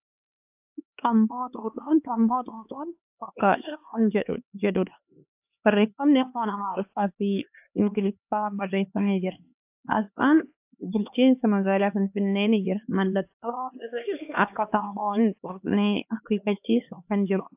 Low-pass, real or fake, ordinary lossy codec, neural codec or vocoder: 3.6 kHz; fake; AAC, 32 kbps; codec, 16 kHz, 2 kbps, X-Codec, HuBERT features, trained on LibriSpeech